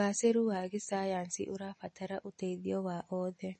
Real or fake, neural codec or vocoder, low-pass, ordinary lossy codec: real; none; 10.8 kHz; MP3, 32 kbps